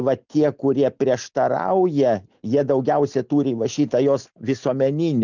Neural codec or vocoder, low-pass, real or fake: none; 7.2 kHz; real